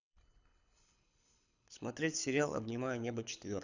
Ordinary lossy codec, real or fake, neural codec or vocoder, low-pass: none; fake; codec, 24 kHz, 6 kbps, HILCodec; 7.2 kHz